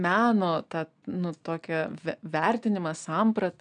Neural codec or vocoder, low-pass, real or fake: none; 9.9 kHz; real